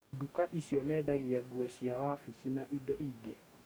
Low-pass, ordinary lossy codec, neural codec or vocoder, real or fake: none; none; codec, 44.1 kHz, 2.6 kbps, DAC; fake